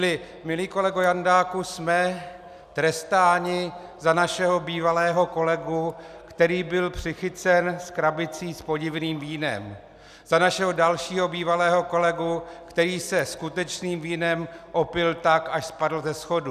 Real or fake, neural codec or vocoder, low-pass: real; none; 14.4 kHz